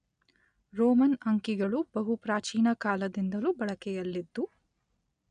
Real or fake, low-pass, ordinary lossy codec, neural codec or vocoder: real; 9.9 kHz; none; none